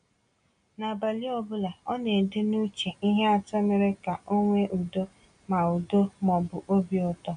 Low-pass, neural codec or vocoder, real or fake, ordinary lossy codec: 9.9 kHz; none; real; none